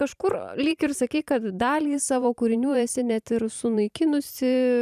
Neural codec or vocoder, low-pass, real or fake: vocoder, 44.1 kHz, 128 mel bands every 512 samples, BigVGAN v2; 14.4 kHz; fake